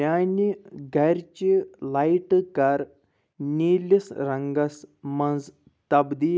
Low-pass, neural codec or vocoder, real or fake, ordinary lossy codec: none; none; real; none